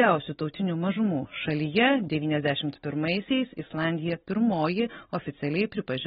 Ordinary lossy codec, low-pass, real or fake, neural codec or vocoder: AAC, 16 kbps; 7.2 kHz; real; none